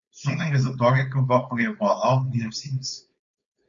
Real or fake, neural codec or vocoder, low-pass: fake; codec, 16 kHz, 4.8 kbps, FACodec; 7.2 kHz